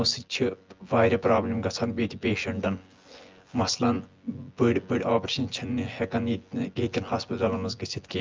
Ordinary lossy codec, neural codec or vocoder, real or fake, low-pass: Opus, 24 kbps; vocoder, 24 kHz, 100 mel bands, Vocos; fake; 7.2 kHz